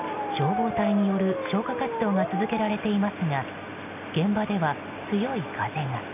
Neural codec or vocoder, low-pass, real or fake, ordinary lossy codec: none; 3.6 kHz; real; none